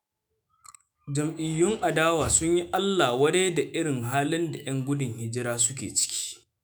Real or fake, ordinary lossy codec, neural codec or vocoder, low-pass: fake; none; autoencoder, 48 kHz, 128 numbers a frame, DAC-VAE, trained on Japanese speech; none